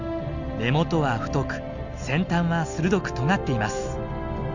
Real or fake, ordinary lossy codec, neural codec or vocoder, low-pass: real; none; none; 7.2 kHz